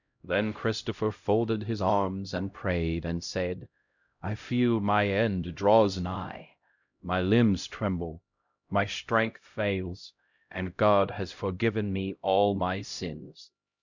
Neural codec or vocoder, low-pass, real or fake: codec, 16 kHz, 0.5 kbps, X-Codec, HuBERT features, trained on LibriSpeech; 7.2 kHz; fake